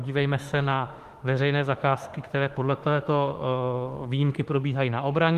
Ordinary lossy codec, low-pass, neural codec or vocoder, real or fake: Opus, 24 kbps; 14.4 kHz; autoencoder, 48 kHz, 32 numbers a frame, DAC-VAE, trained on Japanese speech; fake